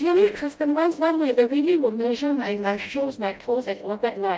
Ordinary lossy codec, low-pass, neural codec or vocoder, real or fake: none; none; codec, 16 kHz, 0.5 kbps, FreqCodec, smaller model; fake